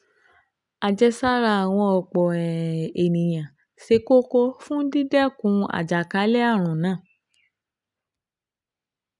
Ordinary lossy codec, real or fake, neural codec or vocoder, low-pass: none; real; none; 10.8 kHz